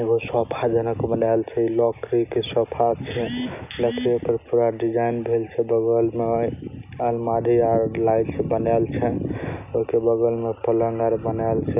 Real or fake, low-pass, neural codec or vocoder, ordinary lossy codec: real; 3.6 kHz; none; none